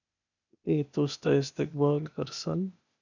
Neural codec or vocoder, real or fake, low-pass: codec, 16 kHz, 0.8 kbps, ZipCodec; fake; 7.2 kHz